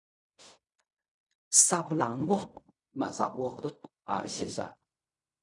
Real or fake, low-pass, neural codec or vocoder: fake; 10.8 kHz; codec, 16 kHz in and 24 kHz out, 0.4 kbps, LongCat-Audio-Codec, fine tuned four codebook decoder